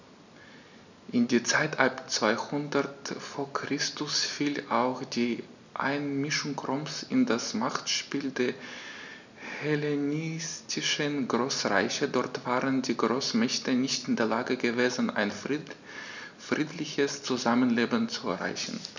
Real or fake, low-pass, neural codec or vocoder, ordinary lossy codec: real; 7.2 kHz; none; none